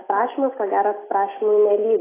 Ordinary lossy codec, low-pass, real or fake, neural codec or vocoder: AAC, 16 kbps; 3.6 kHz; real; none